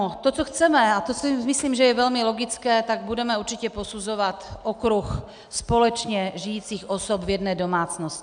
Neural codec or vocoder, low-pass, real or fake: none; 9.9 kHz; real